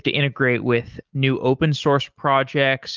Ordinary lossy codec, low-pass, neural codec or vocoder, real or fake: Opus, 32 kbps; 7.2 kHz; none; real